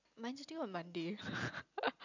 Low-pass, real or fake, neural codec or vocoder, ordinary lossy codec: 7.2 kHz; real; none; none